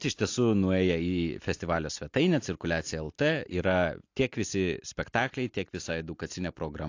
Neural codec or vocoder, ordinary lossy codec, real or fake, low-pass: none; AAC, 48 kbps; real; 7.2 kHz